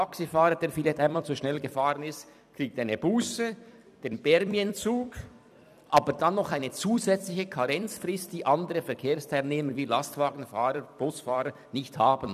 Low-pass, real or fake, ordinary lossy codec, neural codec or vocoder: 14.4 kHz; fake; none; vocoder, 44.1 kHz, 128 mel bands every 256 samples, BigVGAN v2